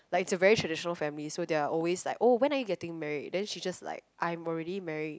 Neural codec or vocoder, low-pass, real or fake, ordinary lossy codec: none; none; real; none